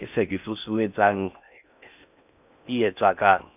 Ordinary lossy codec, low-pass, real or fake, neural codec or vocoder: none; 3.6 kHz; fake; codec, 16 kHz in and 24 kHz out, 0.6 kbps, FocalCodec, streaming, 4096 codes